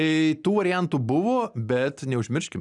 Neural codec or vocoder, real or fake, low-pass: none; real; 10.8 kHz